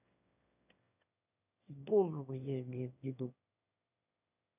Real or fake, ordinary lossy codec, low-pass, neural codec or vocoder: fake; none; 3.6 kHz; autoencoder, 22.05 kHz, a latent of 192 numbers a frame, VITS, trained on one speaker